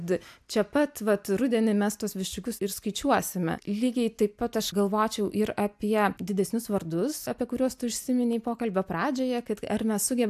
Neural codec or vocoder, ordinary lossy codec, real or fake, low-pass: none; AAC, 96 kbps; real; 14.4 kHz